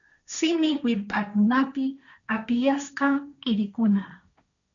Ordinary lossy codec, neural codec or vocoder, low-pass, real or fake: MP3, 96 kbps; codec, 16 kHz, 1.1 kbps, Voila-Tokenizer; 7.2 kHz; fake